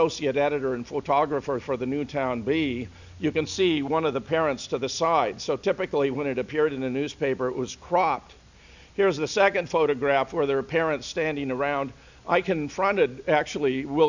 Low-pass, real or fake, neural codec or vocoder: 7.2 kHz; real; none